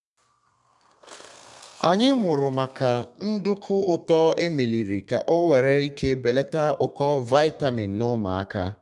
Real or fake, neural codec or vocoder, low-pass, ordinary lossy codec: fake; codec, 32 kHz, 1.9 kbps, SNAC; 10.8 kHz; none